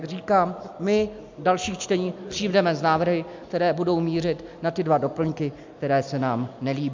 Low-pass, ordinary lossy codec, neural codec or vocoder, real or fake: 7.2 kHz; MP3, 64 kbps; none; real